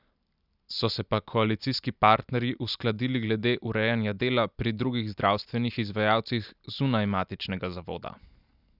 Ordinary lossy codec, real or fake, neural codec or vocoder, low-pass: none; real; none; 5.4 kHz